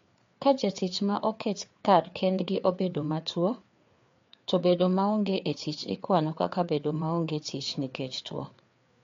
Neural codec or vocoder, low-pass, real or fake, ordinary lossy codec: codec, 16 kHz, 4 kbps, FreqCodec, larger model; 7.2 kHz; fake; MP3, 48 kbps